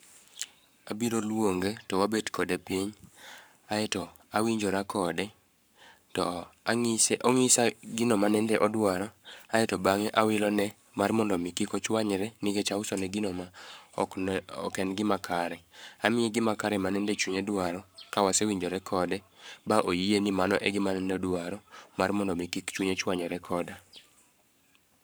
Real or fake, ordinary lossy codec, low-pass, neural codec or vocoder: fake; none; none; codec, 44.1 kHz, 7.8 kbps, Pupu-Codec